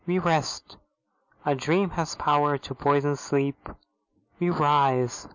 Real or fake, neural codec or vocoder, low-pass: real; none; 7.2 kHz